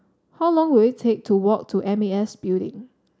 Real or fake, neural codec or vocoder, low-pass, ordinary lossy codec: real; none; none; none